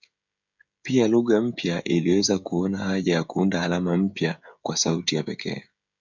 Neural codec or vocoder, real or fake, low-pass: codec, 16 kHz, 16 kbps, FreqCodec, smaller model; fake; 7.2 kHz